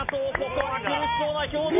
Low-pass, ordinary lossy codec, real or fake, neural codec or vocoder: 3.6 kHz; none; real; none